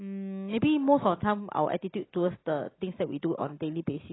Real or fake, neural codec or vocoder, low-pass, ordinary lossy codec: real; none; 7.2 kHz; AAC, 16 kbps